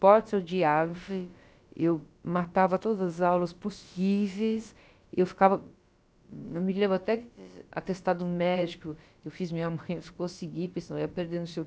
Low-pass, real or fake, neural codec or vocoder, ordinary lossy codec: none; fake; codec, 16 kHz, about 1 kbps, DyCAST, with the encoder's durations; none